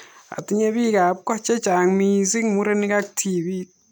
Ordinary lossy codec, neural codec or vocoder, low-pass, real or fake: none; none; none; real